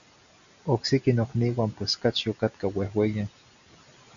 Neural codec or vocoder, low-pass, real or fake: none; 7.2 kHz; real